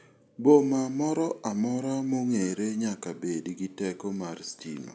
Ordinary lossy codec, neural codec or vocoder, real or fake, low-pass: none; none; real; none